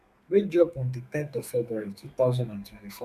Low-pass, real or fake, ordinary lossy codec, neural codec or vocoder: 14.4 kHz; fake; none; codec, 32 kHz, 1.9 kbps, SNAC